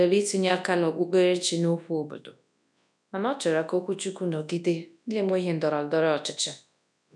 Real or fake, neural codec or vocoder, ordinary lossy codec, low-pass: fake; codec, 24 kHz, 0.9 kbps, WavTokenizer, large speech release; none; none